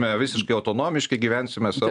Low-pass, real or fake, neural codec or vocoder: 9.9 kHz; real; none